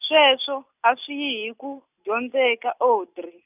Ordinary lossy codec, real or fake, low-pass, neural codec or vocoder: none; real; 3.6 kHz; none